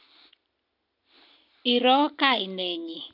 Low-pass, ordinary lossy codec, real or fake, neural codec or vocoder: 5.4 kHz; none; real; none